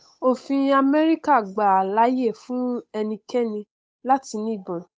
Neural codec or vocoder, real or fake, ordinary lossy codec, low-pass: codec, 16 kHz, 8 kbps, FunCodec, trained on Chinese and English, 25 frames a second; fake; none; none